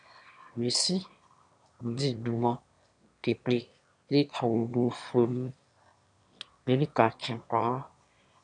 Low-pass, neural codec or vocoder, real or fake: 9.9 kHz; autoencoder, 22.05 kHz, a latent of 192 numbers a frame, VITS, trained on one speaker; fake